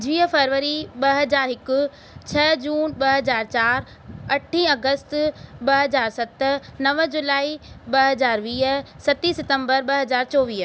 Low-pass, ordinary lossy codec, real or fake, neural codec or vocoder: none; none; real; none